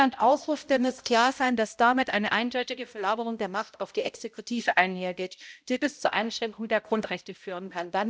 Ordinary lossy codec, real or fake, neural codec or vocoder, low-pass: none; fake; codec, 16 kHz, 0.5 kbps, X-Codec, HuBERT features, trained on balanced general audio; none